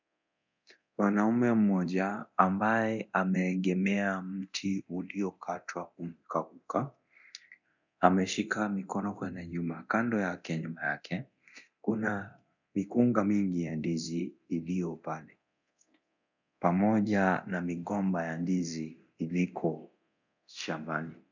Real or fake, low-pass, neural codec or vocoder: fake; 7.2 kHz; codec, 24 kHz, 0.9 kbps, DualCodec